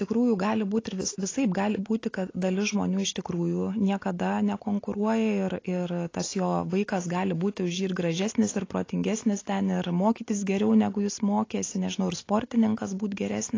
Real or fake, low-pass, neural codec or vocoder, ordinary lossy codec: real; 7.2 kHz; none; AAC, 32 kbps